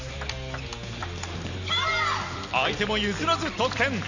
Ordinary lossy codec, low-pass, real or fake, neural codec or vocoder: none; 7.2 kHz; real; none